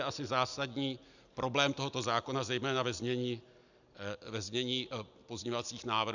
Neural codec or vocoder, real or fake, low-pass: none; real; 7.2 kHz